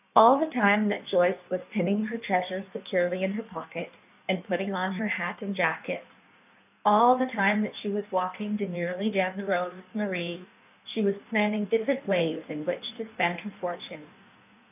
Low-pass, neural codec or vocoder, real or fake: 3.6 kHz; codec, 16 kHz in and 24 kHz out, 1.1 kbps, FireRedTTS-2 codec; fake